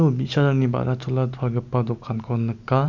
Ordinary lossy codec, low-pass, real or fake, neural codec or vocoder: none; 7.2 kHz; real; none